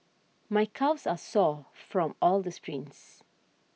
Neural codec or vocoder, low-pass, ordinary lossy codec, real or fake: none; none; none; real